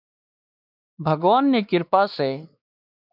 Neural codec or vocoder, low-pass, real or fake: codec, 16 kHz, 2 kbps, X-Codec, WavLM features, trained on Multilingual LibriSpeech; 5.4 kHz; fake